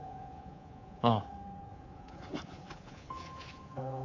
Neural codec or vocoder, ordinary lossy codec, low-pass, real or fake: codec, 24 kHz, 3.1 kbps, DualCodec; AAC, 32 kbps; 7.2 kHz; fake